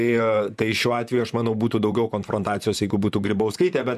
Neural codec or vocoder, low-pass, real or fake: vocoder, 44.1 kHz, 128 mel bands, Pupu-Vocoder; 14.4 kHz; fake